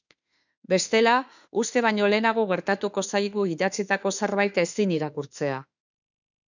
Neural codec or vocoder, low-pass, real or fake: autoencoder, 48 kHz, 32 numbers a frame, DAC-VAE, trained on Japanese speech; 7.2 kHz; fake